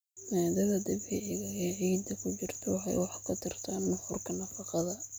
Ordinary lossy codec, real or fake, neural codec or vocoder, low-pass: none; real; none; none